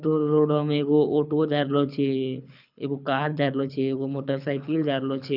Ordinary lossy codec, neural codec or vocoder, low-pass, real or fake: none; codec, 24 kHz, 6 kbps, HILCodec; 5.4 kHz; fake